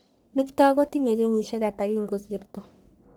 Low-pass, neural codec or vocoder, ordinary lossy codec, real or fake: none; codec, 44.1 kHz, 1.7 kbps, Pupu-Codec; none; fake